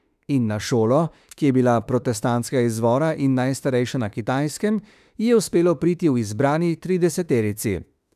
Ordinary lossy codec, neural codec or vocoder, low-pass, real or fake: none; autoencoder, 48 kHz, 32 numbers a frame, DAC-VAE, trained on Japanese speech; 14.4 kHz; fake